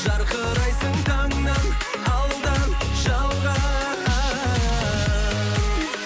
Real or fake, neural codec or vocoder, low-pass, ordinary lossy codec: real; none; none; none